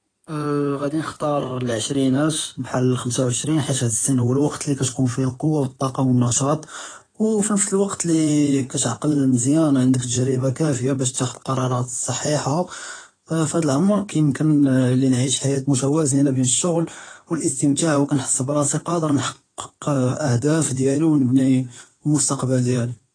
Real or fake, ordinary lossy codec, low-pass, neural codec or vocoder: fake; AAC, 32 kbps; 9.9 kHz; codec, 16 kHz in and 24 kHz out, 2.2 kbps, FireRedTTS-2 codec